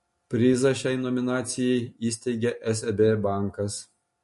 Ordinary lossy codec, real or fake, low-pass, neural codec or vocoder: MP3, 48 kbps; real; 14.4 kHz; none